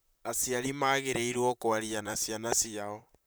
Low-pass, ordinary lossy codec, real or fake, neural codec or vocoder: none; none; fake; vocoder, 44.1 kHz, 128 mel bands, Pupu-Vocoder